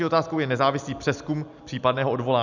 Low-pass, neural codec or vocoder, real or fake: 7.2 kHz; none; real